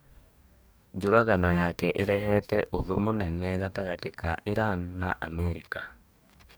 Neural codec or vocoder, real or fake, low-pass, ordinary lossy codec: codec, 44.1 kHz, 2.6 kbps, DAC; fake; none; none